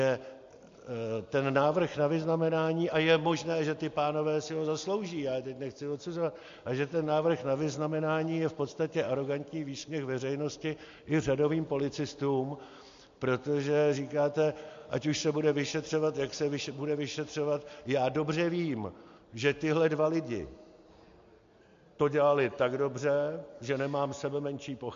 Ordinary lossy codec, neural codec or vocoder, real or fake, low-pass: MP3, 48 kbps; none; real; 7.2 kHz